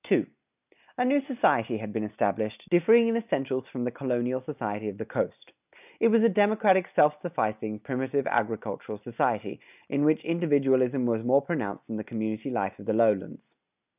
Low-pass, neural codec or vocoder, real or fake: 3.6 kHz; none; real